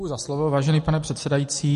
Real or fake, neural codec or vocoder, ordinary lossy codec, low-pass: real; none; MP3, 48 kbps; 14.4 kHz